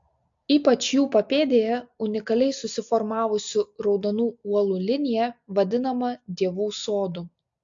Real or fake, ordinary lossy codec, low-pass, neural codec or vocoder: real; AAC, 64 kbps; 7.2 kHz; none